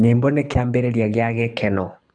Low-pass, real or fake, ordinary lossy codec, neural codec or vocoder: 9.9 kHz; fake; none; codec, 24 kHz, 6 kbps, HILCodec